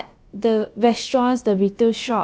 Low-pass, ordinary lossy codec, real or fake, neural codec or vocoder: none; none; fake; codec, 16 kHz, about 1 kbps, DyCAST, with the encoder's durations